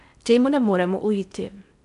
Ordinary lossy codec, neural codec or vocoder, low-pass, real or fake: none; codec, 16 kHz in and 24 kHz out, 0.6 kbps, FocalCodec, streaming, 4096 codes; 10.8 kHz; fake